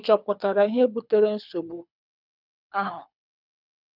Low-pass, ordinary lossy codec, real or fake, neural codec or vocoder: 5.4 kHz; none; fake; codec, 24 kHz, 6 kbps, HILCodec